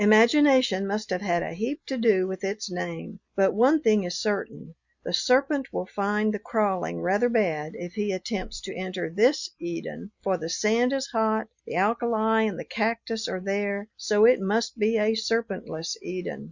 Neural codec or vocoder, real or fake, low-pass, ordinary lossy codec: none; real; 7.2 kHz; Opus, 64 kbps